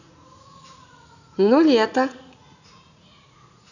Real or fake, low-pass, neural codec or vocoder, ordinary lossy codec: fake; 7.2 kHz; vocoder, 44.1 kHz, 80 mel bands, Vocos; none